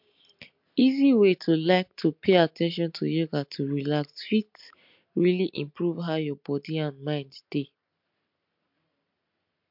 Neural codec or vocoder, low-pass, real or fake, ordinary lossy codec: none; 5.4 kHz; real; MP3, 48 kbps